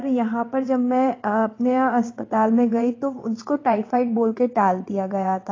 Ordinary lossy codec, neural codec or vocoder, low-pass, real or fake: AAC, 32 kbps; vocoder, 22.05 kHz, 80 mel bands, WaveNeXt; 7.2 kHz; fake